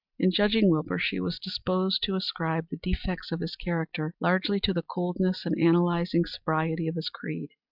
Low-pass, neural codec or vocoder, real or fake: 5.4 kHz; none; real